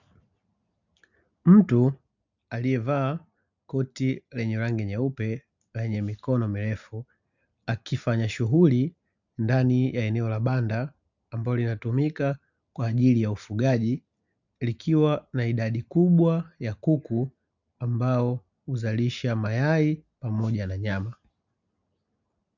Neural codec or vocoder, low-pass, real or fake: none; 7.2 kHz; real